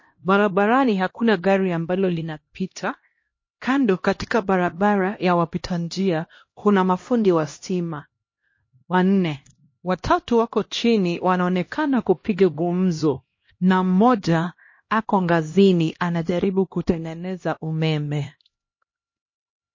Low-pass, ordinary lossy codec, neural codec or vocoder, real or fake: 7.2 kHz; MP3, 32 kbps; codec, 16 kHz, 1 kbps, X-Codec, HuBERT features, trained on LibriSpeech; fake